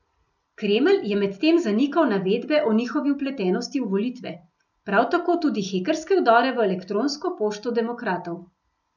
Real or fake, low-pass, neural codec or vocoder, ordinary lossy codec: real; 7.2 kHz; none; none